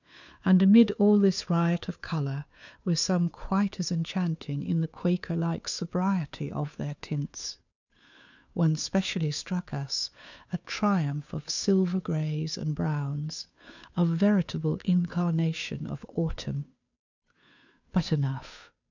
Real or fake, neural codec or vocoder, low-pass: fake; codec, 16 kHz, 2 kbps, FunCodec, trained on Chinese and English, 25 frames a second; 7.2 kHz